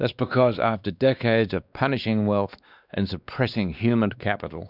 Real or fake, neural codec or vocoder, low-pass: fake; codec, 16 kHz, 2 kbps, X-Codec, WavLM features, trained on Multilingual LibriSpeech; 5.4 kHz